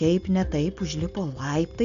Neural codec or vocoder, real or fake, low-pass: none; real; 7.2 kHz